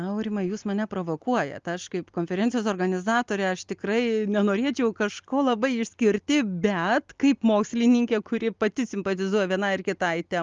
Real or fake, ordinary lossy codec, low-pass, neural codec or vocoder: real; Opus, 32 kbps; 7.2 kHz; none